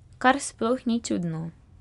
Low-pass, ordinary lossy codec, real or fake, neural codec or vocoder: 10.8 kHz; none; real; none